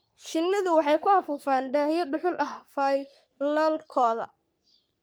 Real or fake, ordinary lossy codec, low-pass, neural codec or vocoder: fake; none; none; codec, 44.1 kHz, 3.4 kbps, Pupu-Codec